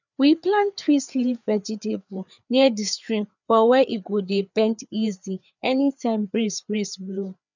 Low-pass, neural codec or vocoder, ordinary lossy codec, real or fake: 7.2 kHz; codec, 16 kHz, 4 kbps, FreqCodec, larger model; none; fake